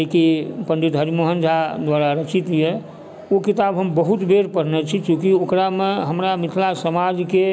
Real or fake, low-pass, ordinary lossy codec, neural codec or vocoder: real; none; none; none